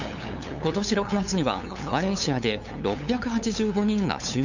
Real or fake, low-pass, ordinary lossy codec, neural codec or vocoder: fake; 7.2 kHz; none; codec, 16 kHz, 8 kbps, FunCodec, trained on LibriTTS, 25 frames a second